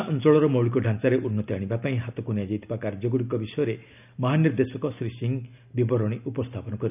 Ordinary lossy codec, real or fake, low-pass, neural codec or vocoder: none; real; 3.6 kHz; none